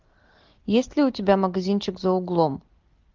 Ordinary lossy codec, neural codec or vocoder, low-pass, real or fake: Opus, 24 kbps; none; 7.2 kHz; real